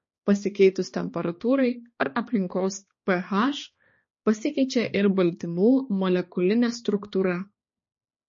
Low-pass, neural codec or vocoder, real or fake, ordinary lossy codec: 7.2 kHz; codec, 16 kHz, 2 kbps, X-Codec, HuBERT features, trained on balanced general audio; fake; MP3, 32 kbps